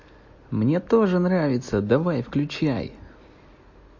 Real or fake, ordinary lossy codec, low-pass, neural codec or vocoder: real; MP3, 32 kbps; 7.2 kHz; none